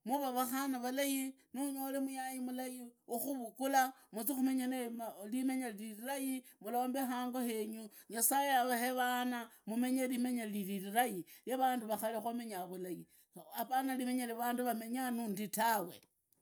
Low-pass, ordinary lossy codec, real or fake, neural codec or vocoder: none; none; real; none